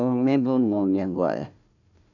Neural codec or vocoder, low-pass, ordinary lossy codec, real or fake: codec, 16 kHz, 1 kbps, FunCodec, trained on Chinese and English, 50 frames a second; 7.2 kHz; none; fake